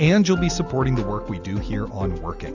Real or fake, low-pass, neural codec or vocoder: real; 7.2 kHz; none